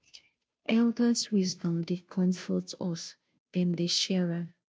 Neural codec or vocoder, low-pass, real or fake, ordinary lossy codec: codec, 16 kHz, 0.5 kbps, FunCodec, trained on Chinese and English, 25 frames a second; none; fake; none